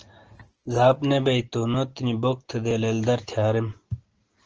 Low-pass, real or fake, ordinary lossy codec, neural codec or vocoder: 7.2 kHz; real; Opus, 16 kbps; none